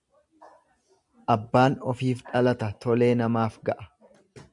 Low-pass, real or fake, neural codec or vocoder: 10.8 kHz; real; none